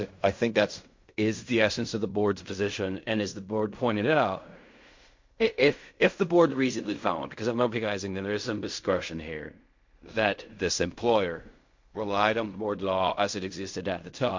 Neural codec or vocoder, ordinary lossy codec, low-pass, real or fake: codec, 16 kHz in and 24 kHz out, 0.4 kbps, LongCat-Audio-Codec, fine tuned four codebook decoder; MP3, 48 kbps; 7.2 kHz; fake